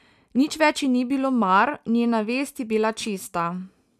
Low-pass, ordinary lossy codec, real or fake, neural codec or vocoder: 14.4 kHz; none; real; none